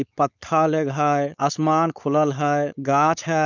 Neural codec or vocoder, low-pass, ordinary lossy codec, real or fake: codec, 16 kHz, 4.8 kbps, FACodec; 7.2 kHz; none; fake